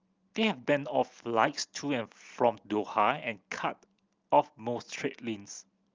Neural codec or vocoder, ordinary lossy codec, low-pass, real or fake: none; Opus, 32 kbps; 7.2 kHz; real